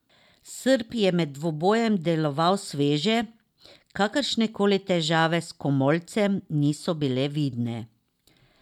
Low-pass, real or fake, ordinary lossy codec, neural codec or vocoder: 19.8 kHz; real; none; none